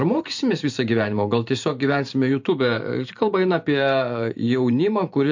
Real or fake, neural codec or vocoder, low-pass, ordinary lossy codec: fake; vocoder, 44.1 kHz, 128 mel bands every 512 samples, BigVGAN v2; 7.2 kHz; MP3, 48 kbps